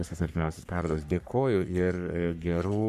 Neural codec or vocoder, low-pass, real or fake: codec, 44.1 kHz, 3.4 kbps, Pupu-Codec; 14.4 kHz; fake